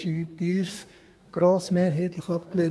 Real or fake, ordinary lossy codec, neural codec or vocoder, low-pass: fake; none; codec, 24 kHz, 1 kbps, SNAC; none